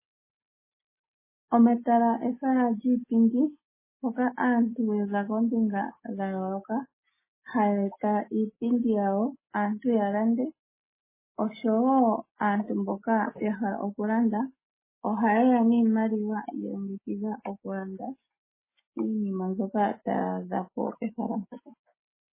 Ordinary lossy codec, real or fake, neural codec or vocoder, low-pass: MP3, 16 kbps; real; none; 3.6 kHz